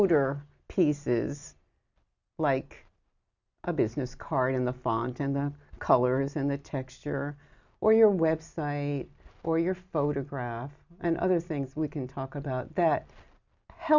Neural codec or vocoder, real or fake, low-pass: none; real; 7.2 kHz